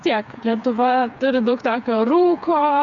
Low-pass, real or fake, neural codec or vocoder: 7.2 kHz; fake; codec, 16 kHz, 4 kbps, FreqCodec, smaller model